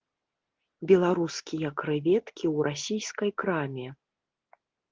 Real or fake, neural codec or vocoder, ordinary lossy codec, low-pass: real; none; Opus, 16 kbps; 7.2 kHz